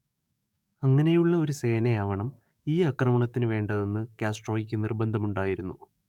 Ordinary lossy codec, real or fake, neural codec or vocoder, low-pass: none; fake; codec, 44.1 kHz, 7.8 kbps, DAC; 19.8 kHz